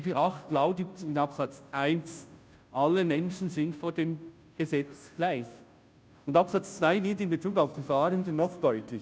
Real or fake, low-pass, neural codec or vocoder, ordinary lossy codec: fake; none; codec, 16 kHz, 0.5 kbps, FunCodec, trained on Chinese and English, 25 frames a second; none